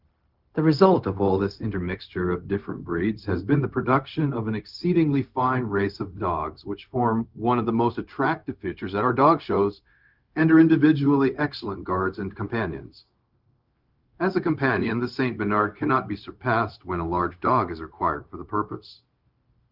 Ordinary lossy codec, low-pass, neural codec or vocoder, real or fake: Opus, 24 kbps; 5.4 kHz; codec, 16 kHz, 0.4 kbps, LongCat-Audio-Codec; fake